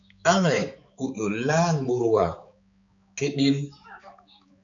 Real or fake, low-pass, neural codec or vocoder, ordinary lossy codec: fake; 7.2 kHz; codec, 16 kHz, 4 kbps, X-Codec, HuBERT features, trained on balanced general audio; AAC, 64 kbps